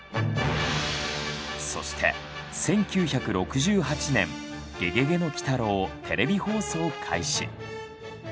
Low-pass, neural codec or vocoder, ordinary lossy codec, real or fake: none; none; none; real